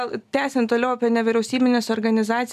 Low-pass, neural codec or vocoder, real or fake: 14.4 kHz; none; real